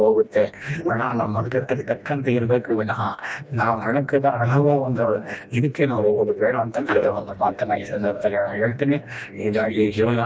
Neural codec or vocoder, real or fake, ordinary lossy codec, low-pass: codec, 16 kHz, 1 kbps, FreqCodec, smaller model; fake; none; none